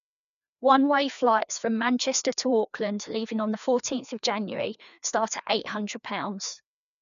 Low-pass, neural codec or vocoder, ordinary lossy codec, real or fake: 7.2 kHz; codec, 16 kHz, 2 kbps, FreqCodec, larger model; none; fake